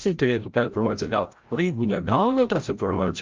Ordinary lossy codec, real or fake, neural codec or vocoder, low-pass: Opus, 24 kbps; fake; codec, 16 kHz, 0.5 kbps, FreqCodec, larger model; 7.2 kHz